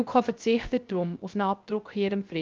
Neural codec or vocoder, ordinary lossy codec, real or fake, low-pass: codec, 16 kHz, 0.3 kbps, FocalCodec; Opus, 24 kbps; fake; 7.2 kHz